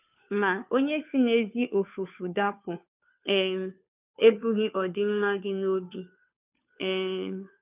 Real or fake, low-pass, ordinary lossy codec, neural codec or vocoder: fake; 3.6 kHz; none; codec, 16 kHz, 2 kbps, FunCodec, trained on Chinese and English, 25 frames a second